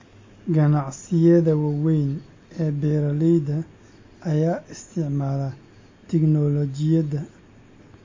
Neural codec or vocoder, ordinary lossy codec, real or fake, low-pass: none; MP3, 32 kbps; real; 7.2 kHz